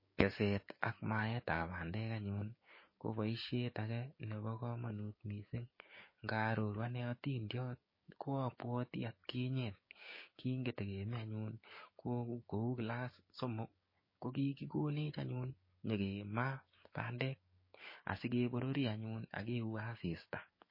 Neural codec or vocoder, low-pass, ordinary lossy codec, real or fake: codec, 16 kHz, 6 kbps, DAC; 5.4 kHz; MP3, 24 kbps; fake